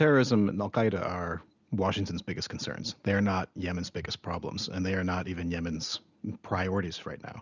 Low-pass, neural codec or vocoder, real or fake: 7.2 kHz; none; real